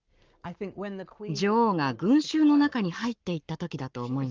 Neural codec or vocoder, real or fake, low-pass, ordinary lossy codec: codec, 44.1 kHz, 7.8 kbps, Pupu-Codec; fake; 7.2 kHz; Opus, 32 kbps